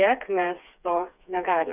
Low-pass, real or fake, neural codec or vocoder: 3.6 kHz; fake; codec, 16 kHz, 4 kbps, FreqCodec, smaller model